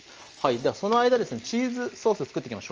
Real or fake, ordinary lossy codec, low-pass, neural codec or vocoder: real; Opus, 24 kbps; 7.2 kHz; none